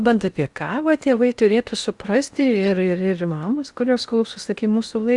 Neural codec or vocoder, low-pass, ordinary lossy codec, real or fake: codec, 16 kHz in and 24 kHz out, 0.6 kbps, FocalCodec, streaming, 4096 codes; 10.8 kHz; Opus, 64 kbps; fake